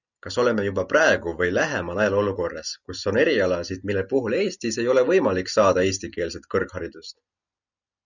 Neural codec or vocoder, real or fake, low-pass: none; real; 7.2 kHz